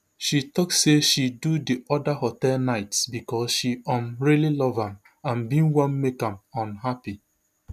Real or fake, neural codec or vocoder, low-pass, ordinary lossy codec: real; none; 14.4 kHz; none